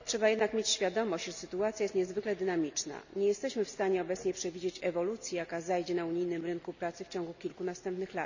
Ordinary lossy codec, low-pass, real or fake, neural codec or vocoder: none; 7.2 kHz; real; none